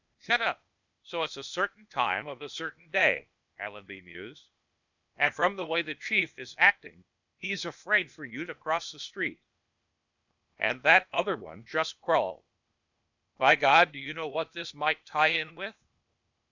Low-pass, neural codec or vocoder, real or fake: 7.2 kHz; codec, 16 kHz, 0.8 kbps, ZipCodec; fake